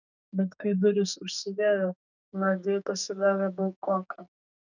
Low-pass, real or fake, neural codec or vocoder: 7.2 kHz; fake; codec, 44.1 kHz, 2.6 kbps, SNAC